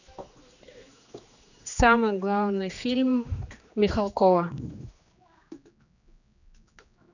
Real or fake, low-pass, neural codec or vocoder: fake; 7.2 kHz; codec, 16 kHz, 2 kbps, X-Codec, HuBERT features, trained on general audio